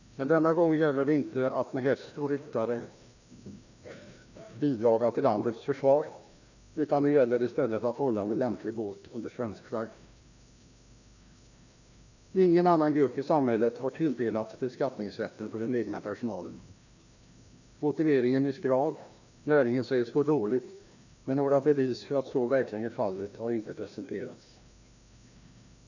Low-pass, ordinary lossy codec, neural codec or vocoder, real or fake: 7.2 kHz; none; codec, 16 kHz, 1 kbps, FreqCodec, larger model; fake